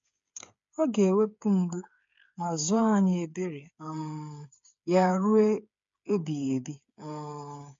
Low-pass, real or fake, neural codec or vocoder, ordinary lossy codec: 7.2 kHz; fake; codec, 16 kHz, 8 kbps, FreqCodec, smaller model; MP3, 48 kbps